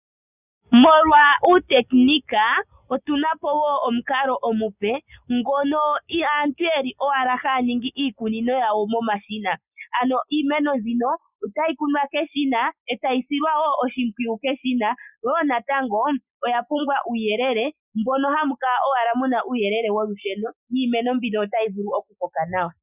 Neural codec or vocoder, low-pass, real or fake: none; 3.6 kHz; real